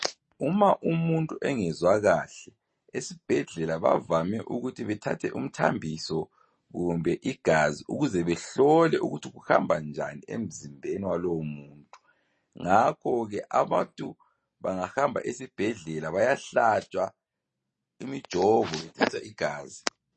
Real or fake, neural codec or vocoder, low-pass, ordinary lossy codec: real; none; 10.8 kHz; MP3, 32 kbps